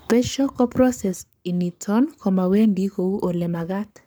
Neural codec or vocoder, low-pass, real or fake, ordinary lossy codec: codec, 44.1 kHz, 7.8 kbps, Pupu-Codec; none; fake; none